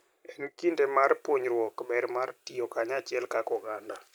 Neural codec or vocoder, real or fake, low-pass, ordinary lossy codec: none; real; none; none